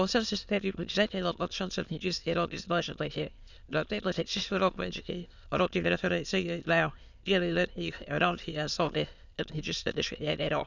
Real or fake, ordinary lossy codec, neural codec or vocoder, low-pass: fake; none; autoencoder, 22.05 kHz, a latent of 192 numbers a frame, VITS, trained on many speakers; 7.2 kHz